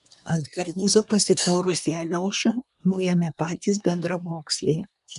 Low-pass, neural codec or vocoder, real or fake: 10.8 kHz; codec, 24 kHz, 1 kbps, SNAC; fake